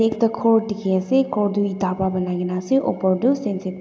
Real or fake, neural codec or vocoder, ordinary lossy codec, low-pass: real; none; none; none